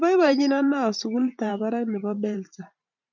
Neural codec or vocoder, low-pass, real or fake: vocoder, 44.1 kHz, 128 mel bands every 512 samples, BigVGAN v2; 7.2 kHz; fake